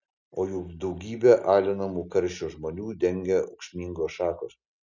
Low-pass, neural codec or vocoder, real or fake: 7.2 kHz; none; real